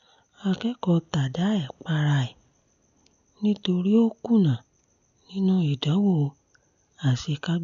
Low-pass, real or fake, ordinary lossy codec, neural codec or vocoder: 7.2 kHz; real; none; none